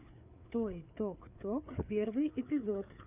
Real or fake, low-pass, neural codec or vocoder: fake; 3.6 kHz; codec, 16 kHz, 4 kbps, FreqCodec, larger model